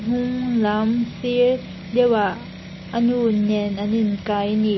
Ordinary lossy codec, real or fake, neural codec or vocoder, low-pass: MP3, 24 kbps; real; none; 7.2 kHz